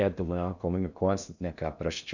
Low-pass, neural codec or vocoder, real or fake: 7.2 kHz; codec, 16 kHz in and 24 kHz out, 0.6 kbps, FocalCodec, streaming, 2048 codes; fake